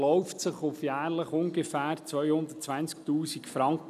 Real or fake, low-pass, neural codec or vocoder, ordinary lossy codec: real; 14.4 kHz; none; none